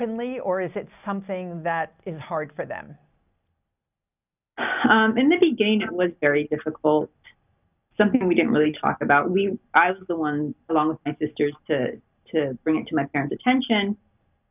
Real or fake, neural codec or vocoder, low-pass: real; none; 3.6 kHz